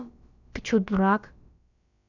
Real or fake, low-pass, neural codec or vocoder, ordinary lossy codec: fake; 7.2 kHz; codec, 16 kHz, about 1 kbps, DyCAST, with the encoder's durations; none